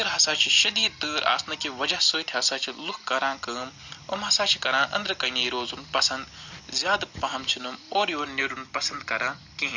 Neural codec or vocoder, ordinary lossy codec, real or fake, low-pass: none; Opus, 64 kbps; real; 7.2 kHz